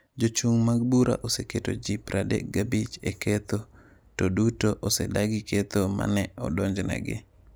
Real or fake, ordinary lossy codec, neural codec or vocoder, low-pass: real; none; none; none